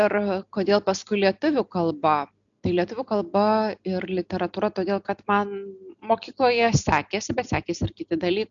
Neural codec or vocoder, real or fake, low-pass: none; real; 7.2 kHz